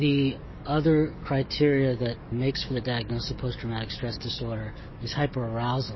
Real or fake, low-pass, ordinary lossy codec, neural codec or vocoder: fake; 7.2 kHz; MP3, 24 kbps; codec, 44.1 kHz, 7.8 kbps, DAC